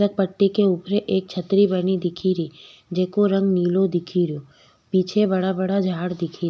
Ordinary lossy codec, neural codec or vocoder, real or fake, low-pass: none; none; real; none